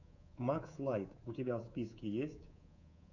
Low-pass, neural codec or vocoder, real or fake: 7.2 kHz; codec, 24 kHz, 3.1 kbps, DualCodec; fake